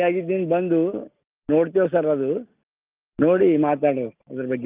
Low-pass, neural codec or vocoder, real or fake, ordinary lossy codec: 3.6 kHz; none; real; Opus, 24 kbps